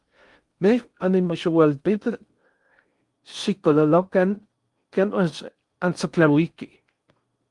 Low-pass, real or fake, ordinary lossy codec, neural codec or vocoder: 10.8 kHz; fake; Opus, 32 kbps; codec, 16 kHz in and 24 kHz out, 0.6 kbps, FocalCodec, streaming, 2048 codes